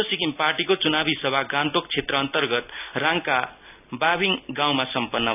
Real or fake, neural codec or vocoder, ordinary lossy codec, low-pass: real; none; none; 3.6 kHz